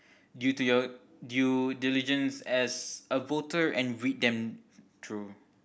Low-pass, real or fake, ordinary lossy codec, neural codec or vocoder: none; real; none; none